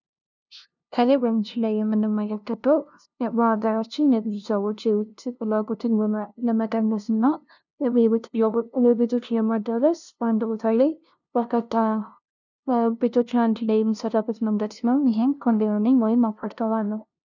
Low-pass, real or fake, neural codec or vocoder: 7.2 kHz; fake; codec, 16 kHz, 0.5 kbps, FunCodec, trained on LibriTTS, 25 frames a second